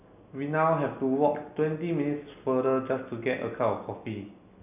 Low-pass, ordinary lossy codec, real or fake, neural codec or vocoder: 3.6 kHz; none; real; none